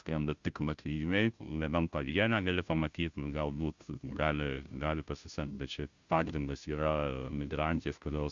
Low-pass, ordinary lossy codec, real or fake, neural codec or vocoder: 7.2 kHz; Opus, 64 kbps; fake; codec, 16 kHz, 0.5 kbps, FunCodec, trained on Chinese and English, 25 frames a second